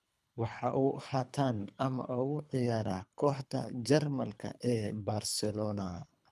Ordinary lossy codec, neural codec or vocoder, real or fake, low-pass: none; codec, 24 kHz, 3 kbps, HILCodec; fake; none